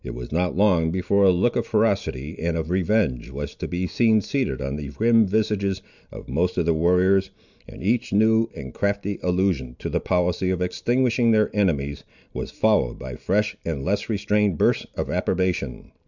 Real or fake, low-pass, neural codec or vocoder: real; 7.2 kHz; none